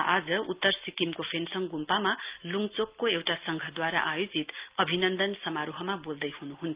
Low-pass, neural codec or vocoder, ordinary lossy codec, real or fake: 3.6 kHz; none; Opus, 32 kbps; real